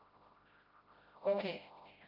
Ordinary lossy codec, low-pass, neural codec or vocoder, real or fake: Opus, 24 kbps; 5.4 kHz; codec, 16 kHz, 0.5 kbps, FreqCodec, smaller model; fake